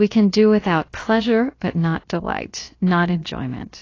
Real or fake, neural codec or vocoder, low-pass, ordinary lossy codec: fake; codec, 16 kHz, about 1 kbps, DyCAST, with the encoder's durations; 7.2 kHz; AAC, 32 kbps